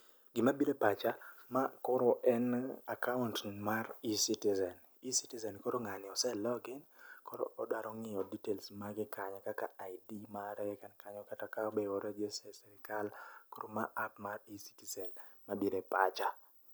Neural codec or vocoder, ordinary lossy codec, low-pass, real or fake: none; none; none; real